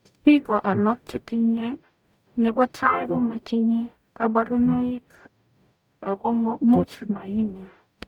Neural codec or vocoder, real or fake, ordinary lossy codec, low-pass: codec, 44.1 kHz, 0.9 kbps, DAC; fake; none; 19.8 kHz